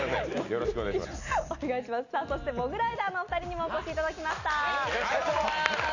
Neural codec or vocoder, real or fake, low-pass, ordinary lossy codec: none; real; 7.2 kHz; none